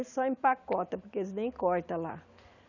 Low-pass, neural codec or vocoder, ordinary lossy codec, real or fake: 7.2 kHz; none; none; real